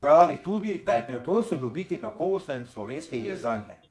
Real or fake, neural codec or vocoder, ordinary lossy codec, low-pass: fake; codec, 24 kHz, 0.9 kbps, WavTokenizer, medium music audio release; none; none